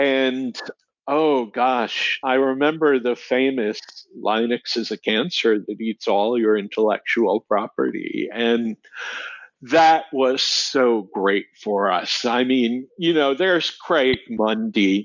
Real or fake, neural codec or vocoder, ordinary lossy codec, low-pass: real; none; MP3, 64 kbps; 7.2 kHz